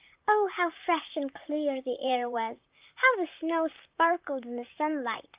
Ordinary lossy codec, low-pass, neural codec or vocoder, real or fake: Opus, 32 kbps; 3.6 kHz; vocoder, 44.1 kHz, 128 mel bands, Pupu-Vocoder; fake